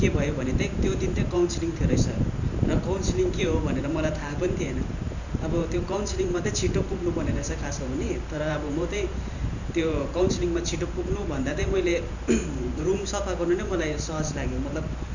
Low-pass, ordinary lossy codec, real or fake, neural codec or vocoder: 7.2 kHz; none; fake; vocoder, 44.1 kHz, 128 mel bands every 512 samples, BigVGAN v2